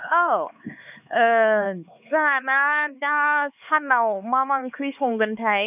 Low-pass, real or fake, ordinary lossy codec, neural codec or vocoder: 3.6 kHz; fake; none; codec, 16 kHz, 4 kbps, X-Codec, HuBERT features, trained on LibriSpeech